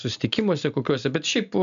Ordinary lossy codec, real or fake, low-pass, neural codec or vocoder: AAC, 96 kbps; real; 7.2 kHz; none